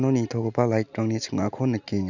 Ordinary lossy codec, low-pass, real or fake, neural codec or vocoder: none; 7.2 kHz; real; none